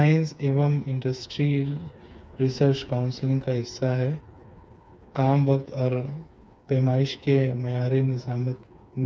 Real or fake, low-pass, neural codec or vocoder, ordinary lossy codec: fake; none; codec, 16 kHz, 4 kbps, FreqCodec, smaller model; none